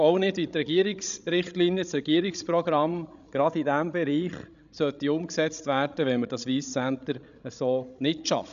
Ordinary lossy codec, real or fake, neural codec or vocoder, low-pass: none; fake; codec, 16 kHz, 16 kbps, FreqCodec, larger model; 7.2 kHz